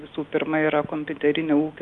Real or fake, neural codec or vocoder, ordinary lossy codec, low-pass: real; none; Opus, 32 kbps; 10.8 kHz